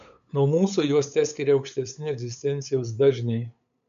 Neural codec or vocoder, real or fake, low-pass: codec, 16 kHz, 8 kbps, FunCodec, trained on LibriTTS, 25 frames a second; fake; 7.2 kHz